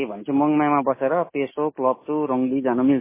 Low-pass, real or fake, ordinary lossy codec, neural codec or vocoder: 3.6 kHz; real; MP3, 16 kbps; none